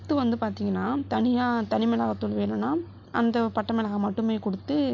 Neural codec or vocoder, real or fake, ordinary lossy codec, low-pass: none; real; AAC, 48 kbps; 7.2 kHz